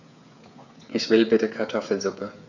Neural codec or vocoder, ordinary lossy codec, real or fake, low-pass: codec, 16 kHz, 8 kbps, FreqCodec, smaller model; none; fake; 7.2 kHz